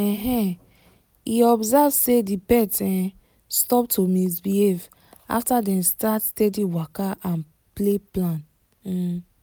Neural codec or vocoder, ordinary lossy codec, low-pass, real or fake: none; none; none; real